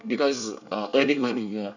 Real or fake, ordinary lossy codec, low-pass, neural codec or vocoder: fake; none; 7.2 kHz; codec, 24 kHz, 1 kbps, SNAC